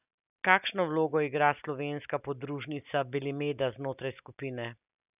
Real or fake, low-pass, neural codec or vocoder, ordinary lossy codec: real; 3.6 kHz; none; none